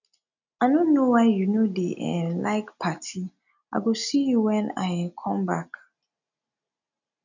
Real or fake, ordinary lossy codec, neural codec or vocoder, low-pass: real; none; none; 7.2 kHz